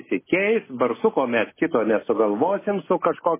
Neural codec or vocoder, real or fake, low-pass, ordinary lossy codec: none; real; 3.6 kHz; MP3, 16 kbps